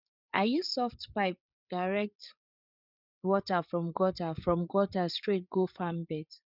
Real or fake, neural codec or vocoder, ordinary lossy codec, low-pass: fake; codec, 16 kHz, 8 kbps, FreqCodec, larger model; none; 5.4 kHz